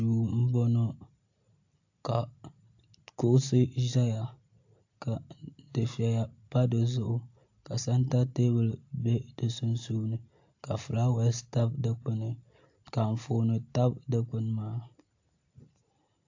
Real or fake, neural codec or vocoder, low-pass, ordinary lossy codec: real; none; 7.2 kHz; AAC, 48 kbps